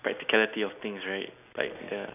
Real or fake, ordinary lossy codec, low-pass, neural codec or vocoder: real; none; 3.6 kHz; none